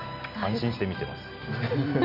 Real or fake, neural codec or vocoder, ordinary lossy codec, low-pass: real; none; none; 5.4 kHz